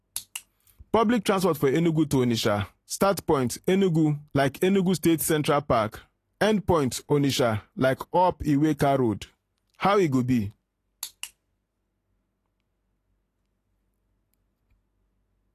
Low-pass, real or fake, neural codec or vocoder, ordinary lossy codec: 14.4 kHz; real; none; AAC, 48 kbps